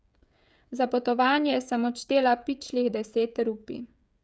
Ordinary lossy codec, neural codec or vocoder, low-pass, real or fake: none; codec, 16 kHz, 16 kbps, FreqCodec, smaller model; none; fake